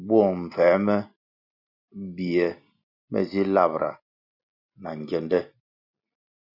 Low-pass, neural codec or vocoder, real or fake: 5.4 kHz; none; real